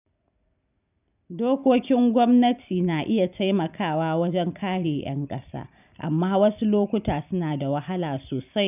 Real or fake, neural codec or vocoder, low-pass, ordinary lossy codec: real; none; 3.6 kHz; none